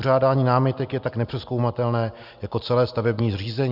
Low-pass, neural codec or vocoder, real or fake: 5.4 kHz; none; real